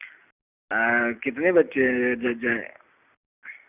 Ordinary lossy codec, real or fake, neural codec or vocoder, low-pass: none; real; none; 3.6 kHz